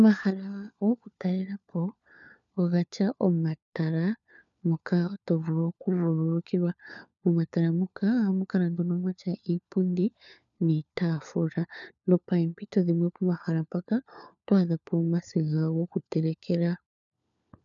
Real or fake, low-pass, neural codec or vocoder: fake; 7.2 kHz; codec, 16 kHz, 4 kbps, FunCodec, trained on LibriTTS, 50 frames a second